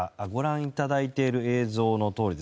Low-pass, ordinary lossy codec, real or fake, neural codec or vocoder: none; none; real; none